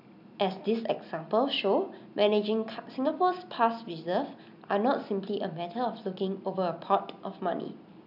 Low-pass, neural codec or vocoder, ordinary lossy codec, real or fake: 5.4 kHz; none; none; real